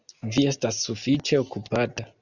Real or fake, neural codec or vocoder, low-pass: real; none; 7.2 kHz